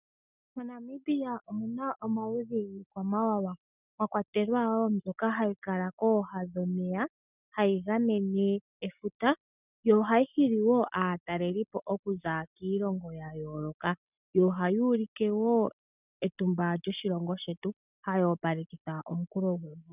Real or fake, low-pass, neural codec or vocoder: real; 3.6 kHz; none